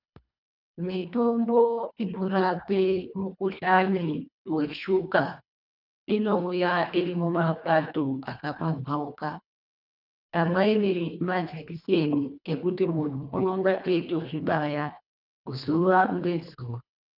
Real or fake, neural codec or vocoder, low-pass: fake; codec, 24 kHz, 1.5 kbps, HILCodec; 5.4 kHz